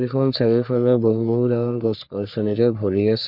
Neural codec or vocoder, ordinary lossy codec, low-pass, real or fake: codec, 44.1 kHz, 3.4 kbps, Pupu-Codec; none; 5.4 kHz; fake